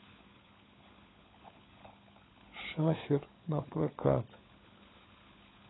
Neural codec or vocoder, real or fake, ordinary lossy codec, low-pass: codec, 16 kHz, 16 kbps, FunCodec, trained on LibriTTS, 50 frames a second; fake; AAC, 16 kbps; 7.2 kHz